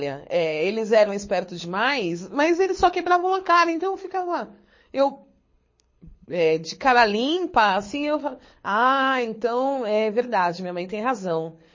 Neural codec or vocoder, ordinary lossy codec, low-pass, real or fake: codec, 24 kHz, 6 kbps, HILCodec; MP3, 32 kbps; 7.2 kHz; fake